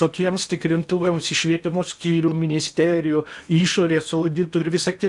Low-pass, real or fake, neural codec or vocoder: 10.8 kHz; fake; codec, 16 kHz in and 24 kHz out, 0.8 kbps, FocalCodec, streaming, 65536 codes